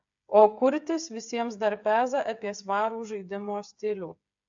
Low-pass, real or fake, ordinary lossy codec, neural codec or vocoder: 7.2 kHz; fake; AAC, 64 kbps; codec, 16 kHz, 8 kbps, FreqCodec, smaller model